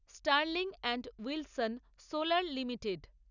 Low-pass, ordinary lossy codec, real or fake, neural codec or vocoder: 7.2 kHz; none; real; none